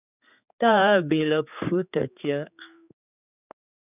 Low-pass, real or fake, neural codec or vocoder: 3.6 kHz; fake; codec, 16 kHz, 4 kbps, X-Codec, HuBERT features, trained on general audio